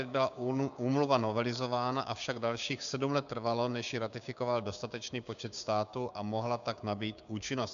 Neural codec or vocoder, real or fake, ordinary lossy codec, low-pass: codec, 16 kHz, 6 kbps, DAC; fake; MP3, 96 kbps; 7.2 kHz